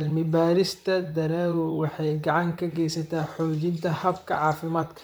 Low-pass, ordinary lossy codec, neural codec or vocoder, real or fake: none; none; vocoder, 44.1 kHz, 128 mel bands, Pupu-Vocoder; fake